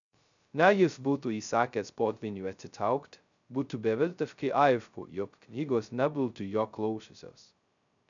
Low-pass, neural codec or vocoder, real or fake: 7.2 kHz; codec, 16 kHz, 0.2 kbps, FocalCodec; fake